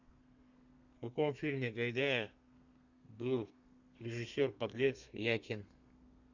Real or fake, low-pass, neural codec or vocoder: fake; 7.2 kHz; codec, 32 kHz, 1.9 kbps, SNAC